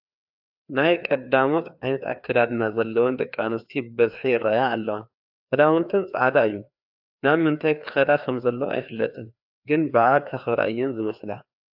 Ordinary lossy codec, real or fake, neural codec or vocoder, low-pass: AAC, 48 kbps; fake; codec, 16 kHz, 2 kbps, FreqCodec, larger model; 5.4 kHz